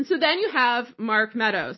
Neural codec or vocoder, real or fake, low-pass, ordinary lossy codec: none; real; 7.2 kHz; MP3, 24 kbps